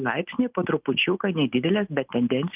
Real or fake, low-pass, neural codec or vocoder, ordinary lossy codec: real; 3.6 kHz; none; Opus, 32 kbps